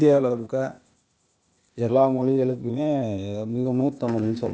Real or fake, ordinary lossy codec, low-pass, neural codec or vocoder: fake; none; none; codec, 16 kHz, 0.8 kbps, ZipCodec